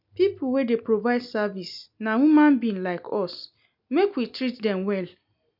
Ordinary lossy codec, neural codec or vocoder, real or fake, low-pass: none; none; real; 5.4 kHz